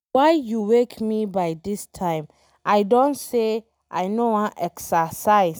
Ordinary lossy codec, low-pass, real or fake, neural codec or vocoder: none; none; real; none